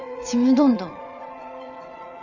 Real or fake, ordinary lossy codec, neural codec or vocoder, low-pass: fake; none; vocoder, 22.05 kHz, 80 mel bands, WaveNeXt; 7.2 kHz